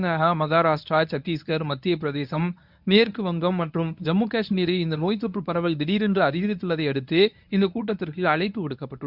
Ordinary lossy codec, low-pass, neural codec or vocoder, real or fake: none; 5.4 kHz; codec, 24 kHz, 0.9 kbps, WavTokenizer, medium speech release version 1; fake